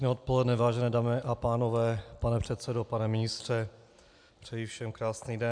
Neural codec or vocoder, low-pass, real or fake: none; 9.9 kHz; real